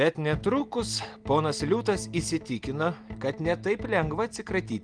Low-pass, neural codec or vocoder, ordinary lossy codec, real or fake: 9.9 kHz; none; Opus, 24 kbps; real